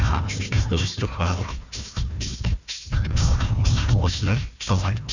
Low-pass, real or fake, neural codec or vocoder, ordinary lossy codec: 7.2 kHz; fake; codec, 16 kHz, 1 kbps, FunCodec, trained on Chinese and English, 50 frames a second; none